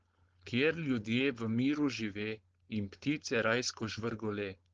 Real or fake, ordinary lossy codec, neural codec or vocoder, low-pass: fake; Opus, 16 kbps; codec, 16 kHz, 4.8 kbps, FACodec; 7.2 kHz